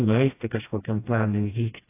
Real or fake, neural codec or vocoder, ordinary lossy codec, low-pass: fake; codec, 16 kHz, 1 kbps, FreqCodec, smaller model; AAC, 24 kbps; 3.6 kHz